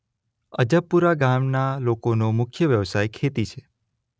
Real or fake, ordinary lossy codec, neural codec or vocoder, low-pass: real; none; none; none